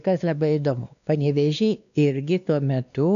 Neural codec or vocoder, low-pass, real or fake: codec, 16 kHz, 2 kbps, X-Codec, WavLM features, trained on Multilingual LibriSpeech; 7.2 kHz; fake